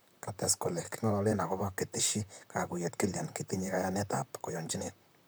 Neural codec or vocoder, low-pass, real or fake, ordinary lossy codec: vocoder, 44.1 kHz, 128 mel bands, Pupu-Vocoder; none; fake; none